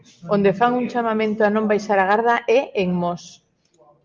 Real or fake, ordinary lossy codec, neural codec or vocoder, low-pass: real; Opus, 24 kbps; none; 7.2 kHz